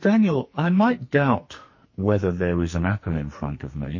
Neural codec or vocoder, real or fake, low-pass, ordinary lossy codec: codec, 44.1 kHz, 2.6 kbps, SNAC; fake; 7.2 kHz; MP3, 32 kbps